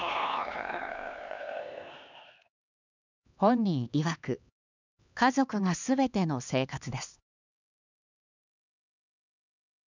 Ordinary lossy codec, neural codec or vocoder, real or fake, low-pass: none; codec, 16 kHz, 2 kbps, X-Codec, HuBERT features, trained on LibriSpeech; fake; 7.2 kHz